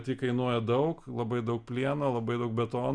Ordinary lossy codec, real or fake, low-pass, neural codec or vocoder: Opus, 64 kbps; real; 9.9 kHz; none